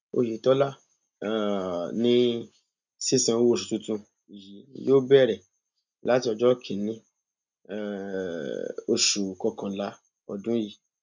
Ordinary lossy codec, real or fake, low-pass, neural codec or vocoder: none; real; 7.2 kHz; none